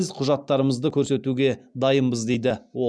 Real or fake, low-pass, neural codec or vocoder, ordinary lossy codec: real; none; none; none